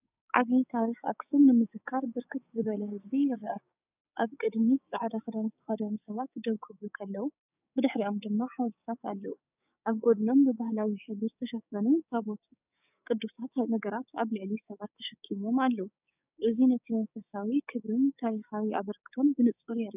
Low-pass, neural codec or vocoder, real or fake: 3.6 kHz; codec, 16 kHz, 6 kbps, DAC; fake